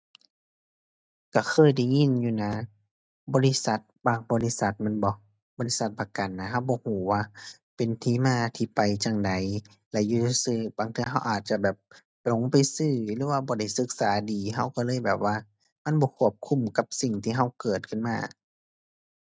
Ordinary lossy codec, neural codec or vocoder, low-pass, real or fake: none; none; none; real